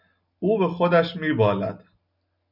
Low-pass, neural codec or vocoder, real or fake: 5.4 kHz; none; real